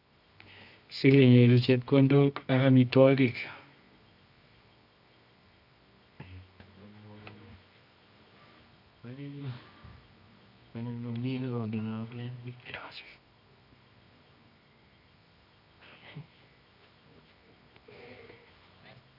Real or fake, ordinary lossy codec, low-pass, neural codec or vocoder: fake; none; 5.4 kHz; codec, 24 kHz, 0.9 kbps, WavTokenizer, medium music audio release